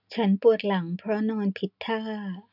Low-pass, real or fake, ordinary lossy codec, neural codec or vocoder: 5.4 kHz; real; none; none